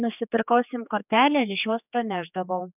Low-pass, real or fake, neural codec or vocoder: 3.6 kHz; fake; codec, 16 kHz, 4 kbps, X-Codec, HuBERT features, trained on general audio